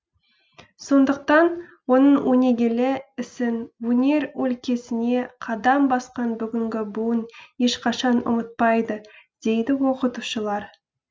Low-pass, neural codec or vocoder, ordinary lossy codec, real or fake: none; none; none; real